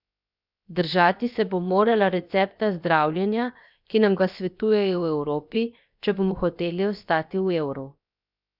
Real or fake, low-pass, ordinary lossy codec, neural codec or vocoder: fake; 5.4 kHz; none; codec, 16 kHz, 0.7 kbps, FocalCodec